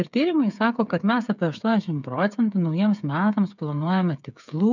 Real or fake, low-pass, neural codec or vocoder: fake; 7.2 kHz; codec, 16 kHz, 8 kbps, FreqCodec, smaller model